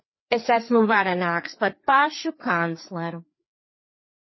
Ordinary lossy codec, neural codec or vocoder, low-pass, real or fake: MP3, 24 kbps; codec, 16 kHz, 4 kbps, FreqCodec, larger model; 7.2 kHz; fake